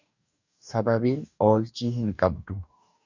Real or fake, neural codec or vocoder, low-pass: fake; codec, 44.1 kHz, 2.6 kbps, DAC; 7.2 kHz